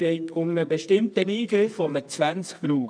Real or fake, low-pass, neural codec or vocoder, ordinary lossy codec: fake; 9.9 kHz; codec, 24 kHz, 0.9 kbps, WavTokenizer, medium music audio release; none